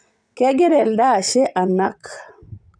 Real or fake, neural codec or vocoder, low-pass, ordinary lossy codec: fake; vocoder, 22.05 kHz, 80 mel bands, WaveNeXt; 9.9 kHz; none